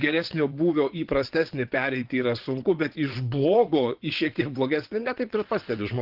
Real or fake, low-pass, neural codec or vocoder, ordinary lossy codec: fake; 5.4 kHz; vocoder, 22.05 kHz, 80 mel bands, WaveNeXt; Opus, 16 kbps